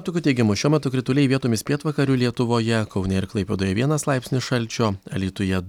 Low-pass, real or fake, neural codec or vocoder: 19.8 kHz; real; none